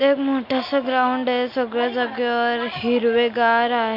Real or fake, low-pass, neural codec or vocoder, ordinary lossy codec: real; 5.4 kHz; none; MP3, 48 kbps